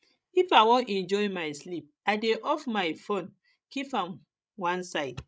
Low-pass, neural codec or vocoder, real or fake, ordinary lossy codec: none; codec, 16 kHz, 16 kbps, FreqCodec, larger model; fake; none